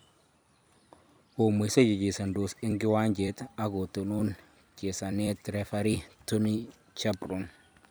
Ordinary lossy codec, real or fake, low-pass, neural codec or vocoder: none; fake; none; vocoder, 44.1 kHz, 128 mel bands every 256 samples, BigVGAN v2